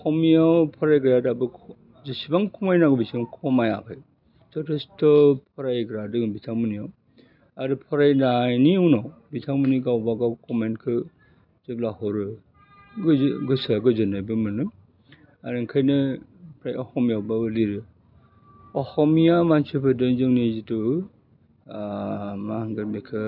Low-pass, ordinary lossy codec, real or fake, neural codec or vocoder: 5.4 kHz; none; real; none